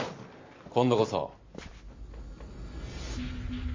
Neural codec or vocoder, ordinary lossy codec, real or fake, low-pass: none; MP3, 32 kbps; real; 7.2 kHz